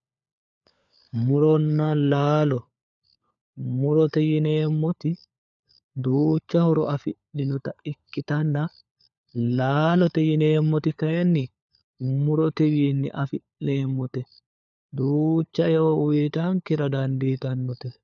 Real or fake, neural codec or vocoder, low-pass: fake; codec, 16 kHz, 4 kbps, FunCodec, trained on LibriTTS, 50 frames a second; 7.2 kHz